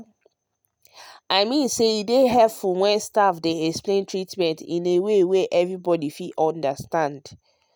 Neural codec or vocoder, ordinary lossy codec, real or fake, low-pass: none; none; real; none